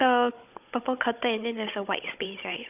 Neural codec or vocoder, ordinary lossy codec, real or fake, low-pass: codec, 16 kHz, 16 kbps, FunCodec, trained on Chinese and English, 50 frames a second; none; fake; 3.6 kHz